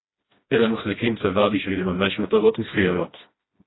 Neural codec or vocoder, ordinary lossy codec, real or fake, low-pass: codec, 16 kHz, 1 kbps, FreqCodec, smaller model; AAC, 16 kbps; fake; 7.2 kHz